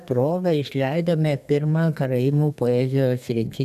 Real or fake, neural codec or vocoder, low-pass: fake; codec, 32 kHz, 1.9 kbps, SNAC; 14.4 kHz